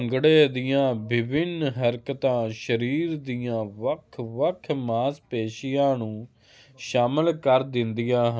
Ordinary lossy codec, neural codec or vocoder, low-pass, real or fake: none; none; none; real